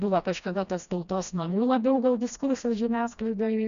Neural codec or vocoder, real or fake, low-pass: codec, 16 kHz, 1 kbps, FreqCodec, smaller model; fake; 7.2 kHz